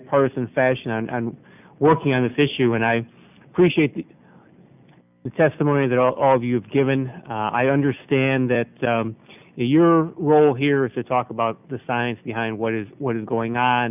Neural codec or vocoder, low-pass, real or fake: none; 3.6 kHz; real